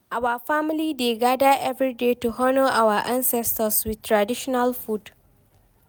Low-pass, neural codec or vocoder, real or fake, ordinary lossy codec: none; none; real; none